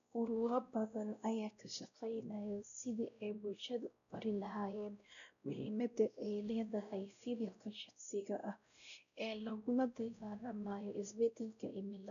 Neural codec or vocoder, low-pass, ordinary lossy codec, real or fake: codec, 16 kHz, 0.5 kbps, X-Codec, WavLM features, trained on Multilingual LibriSpeech; 7.2 kHz; none; fake